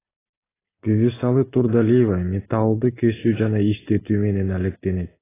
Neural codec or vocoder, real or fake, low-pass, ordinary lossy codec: none; real; 3.6 kHz; AAC, 16 kbps